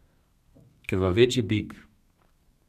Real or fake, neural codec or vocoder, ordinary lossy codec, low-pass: fake; codec, 32 kHz, 1.9 kbps, SNAC; none; 14.4 kHz